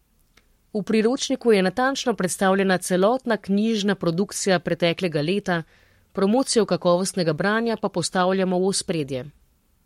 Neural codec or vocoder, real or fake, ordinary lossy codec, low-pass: codec, 44.1 kHz, 7.8 kbps, Pupu-Codec; fake; MP3, 64 kbps; 19.8 kHz